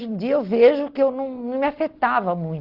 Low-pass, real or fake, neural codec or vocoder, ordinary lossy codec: 5.4 kHz; real; none; Opus, 16 kbps